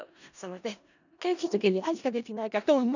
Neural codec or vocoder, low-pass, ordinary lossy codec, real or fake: codec, 16 kHz in and 24 kHz out, 0.4 kbps, LongCat-Audio-Codec, four codebook decoder; 7.2 kHz; none; fake